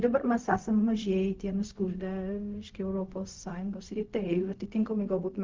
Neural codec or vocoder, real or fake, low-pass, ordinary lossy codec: codec, 16 kHz, 0.4 kbps, LongCat-Audio-Codec; fake; 7.2 kHz; Opus, 24 kbps